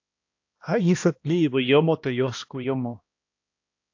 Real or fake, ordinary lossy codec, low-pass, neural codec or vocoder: fake; AAC, 48 kbps; 7.2 kHz; codec, 16 kHz, 1 kbps, X-Codec, HuBERT features, trained on balanced general audio